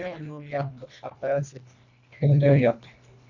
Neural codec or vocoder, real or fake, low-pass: codec, 24 kHz, 1.5 kbps, HILCodec; fake; 7.2 kHz